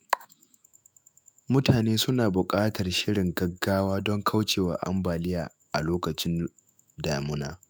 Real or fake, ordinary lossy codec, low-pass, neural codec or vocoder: fake; none; none; autoencoder, 48 kHz, 128 numbers a frame, DAC-VAE, trained on Japanese speech